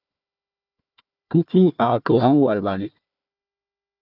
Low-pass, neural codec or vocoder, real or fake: 5.4 kHz; codec, 16 kHz, 1 kbps, FunCodec, trained on Chinese and English, 50 frames a second; fake